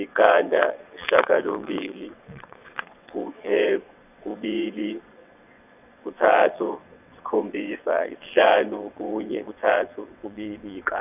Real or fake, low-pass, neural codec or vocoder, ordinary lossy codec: fake; 3.6 kHz; vocoder, 22.05 kHz, 80 mel bands, WaveNeXt; none